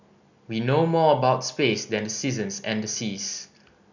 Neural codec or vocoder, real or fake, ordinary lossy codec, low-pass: none; real; none; 7.2 kHz